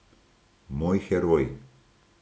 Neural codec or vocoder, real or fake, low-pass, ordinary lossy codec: none; real; none; none